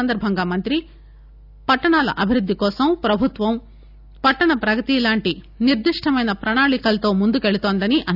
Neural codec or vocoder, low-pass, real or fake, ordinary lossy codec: none; 5.4 kHz; real; none